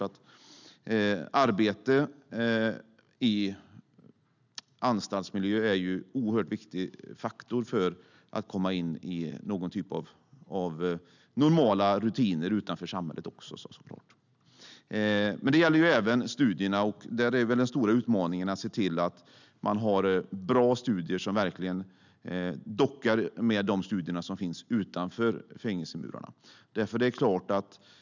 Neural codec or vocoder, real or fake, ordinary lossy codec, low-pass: none; real; none; 7.2 kHz